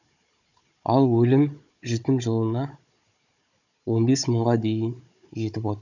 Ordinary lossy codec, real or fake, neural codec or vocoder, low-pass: none; fake; codec, 16 kHz, 16 kbps, FunCodec, trained on Chinese and English, 50 frames a second; 7.2 kHz